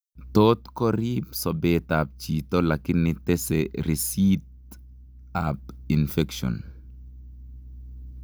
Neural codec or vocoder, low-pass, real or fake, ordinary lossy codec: none; none; real; none